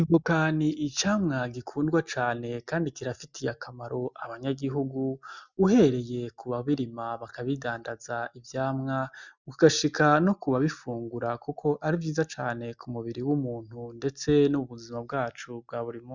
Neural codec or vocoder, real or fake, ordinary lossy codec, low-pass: none; real; Opus, 64 kbps; 7.2 kHz